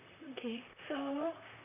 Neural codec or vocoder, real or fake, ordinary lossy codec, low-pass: vocoder, 44.1 kHz, 128 mel bands, Pupu-Vocoder; fake; none; 3.6 kHz